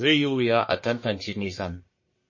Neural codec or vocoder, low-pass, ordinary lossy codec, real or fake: codec, 44.1 kHz, 1.7 kbps, Pupu-Codec; 7.2 kHz; MP3, 32 kbps; fake